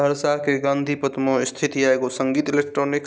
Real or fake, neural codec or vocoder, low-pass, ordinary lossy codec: real; none; none; none